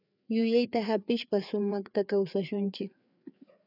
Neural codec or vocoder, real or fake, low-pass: codec, 16 kHz, 4 kbps, FreqCodec, larger model; fake; 5.4 kHz